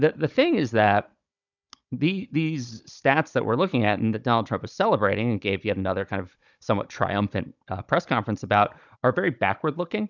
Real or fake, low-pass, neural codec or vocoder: fake; 7.2 kHz; codec, 16 kHz, 16 kbps, FunCodec, trained on Chinese and English, 50 frames a second